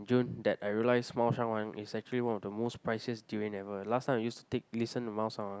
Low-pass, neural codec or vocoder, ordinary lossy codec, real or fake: none; none; none; real